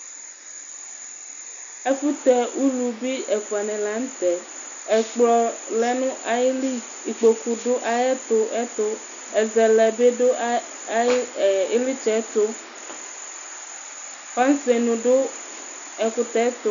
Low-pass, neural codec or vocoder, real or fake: 7.2 kHz; none; real